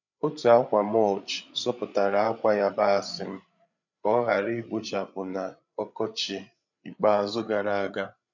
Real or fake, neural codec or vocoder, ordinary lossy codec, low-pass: fake; codec, 16 kHz, 8 kbps, FreqCodec, larger model; none; 7.2 kHz